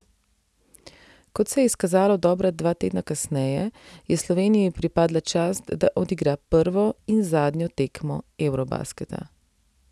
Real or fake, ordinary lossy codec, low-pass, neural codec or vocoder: real; none; none; none